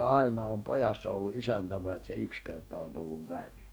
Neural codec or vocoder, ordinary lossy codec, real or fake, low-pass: codec, 44.1 kHz, 2.6 kbps, DAC; none; fake; none